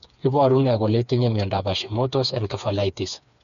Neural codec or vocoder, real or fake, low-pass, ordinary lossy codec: codec, 16 kHz, 4 kbps, FreqCodec, smaller model; fake; 7.2 kHz; none